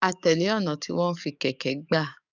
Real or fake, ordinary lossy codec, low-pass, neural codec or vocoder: fake; none; 7.2 kHz; codec, 44.1 kHz, 7.8 kbps, DAC